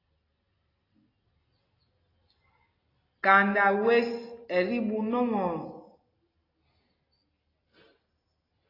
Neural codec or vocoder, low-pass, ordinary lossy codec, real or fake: none; 5.4 kHz; AAC, 24 kbps; real